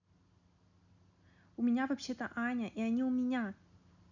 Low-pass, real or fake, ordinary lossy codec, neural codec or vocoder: 7.2 kHz; real; none; none